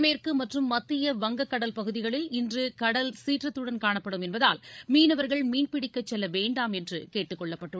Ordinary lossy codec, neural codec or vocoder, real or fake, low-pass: none; codec, 16 kHz, 16 kbps, FreqCodec, larger model; fake; none